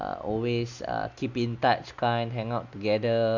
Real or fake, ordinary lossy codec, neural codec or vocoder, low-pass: real; Opus, 64 kbps; none; 7.2 kHz